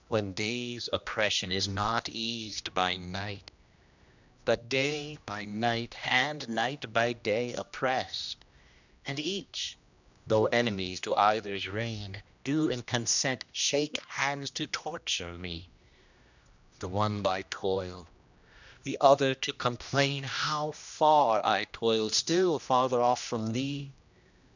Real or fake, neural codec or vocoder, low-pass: fake; codec, 16 kHz, 1 kbps, X-Codec, HuBERT features, trained on general audio; 7.2 kHz